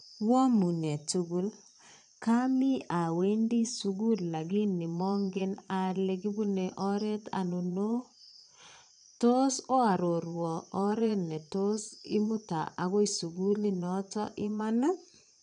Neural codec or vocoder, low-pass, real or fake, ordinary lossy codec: vocoder, 22.05 kHz, 80 mel bands, Vocos; 9.9 kHz; fake; none